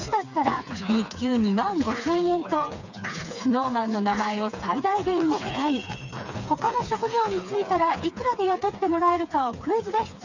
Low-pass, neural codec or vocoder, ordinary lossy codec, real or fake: 7.2 kHz; codec, 16 kHz, 4 kbps, FreqCodec, smaller model; none; fake